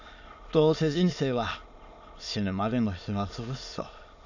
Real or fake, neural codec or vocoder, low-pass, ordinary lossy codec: fake; autoencoder, 22.05 kHz, a latent of 192 numbers a frame, VITS, trained on many speakers; 7.2 kHz; none